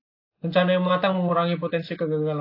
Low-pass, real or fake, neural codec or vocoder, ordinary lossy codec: 5.4 kHz; real; none; AAC, 32 kbps